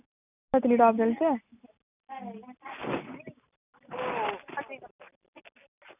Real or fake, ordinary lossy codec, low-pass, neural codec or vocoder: real; none; 3.6 kHz; none